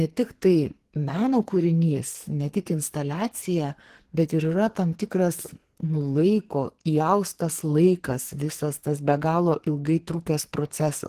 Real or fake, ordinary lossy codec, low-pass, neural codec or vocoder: fake; Opus, 16 kbps; 14.4 kHz; codec, 44.1 kHz, 2.6 kbps, SNAC